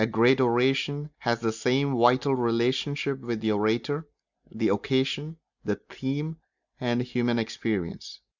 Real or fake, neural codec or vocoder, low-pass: real; none; 7.2 kHz